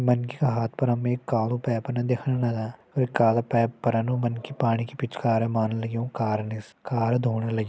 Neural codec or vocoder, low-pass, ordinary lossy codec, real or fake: none; none; none; real